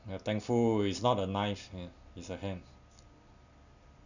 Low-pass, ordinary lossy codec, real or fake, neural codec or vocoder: 7.2 kHz; none; real; none